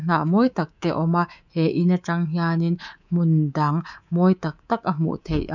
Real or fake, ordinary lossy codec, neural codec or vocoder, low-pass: fake; none; codec, 24 kHz, 3.1 kbps, DualCodec; 7.2 kHz